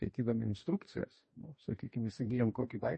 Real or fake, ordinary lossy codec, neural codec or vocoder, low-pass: fake; MP3, 32 kbps; codec, 44.1 kHz, 2.6 kbps, DAC; 10.8 kHz